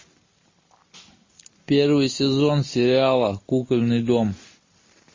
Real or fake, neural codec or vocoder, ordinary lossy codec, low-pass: real; none; MP3, 32 kbps; 7.2 kHz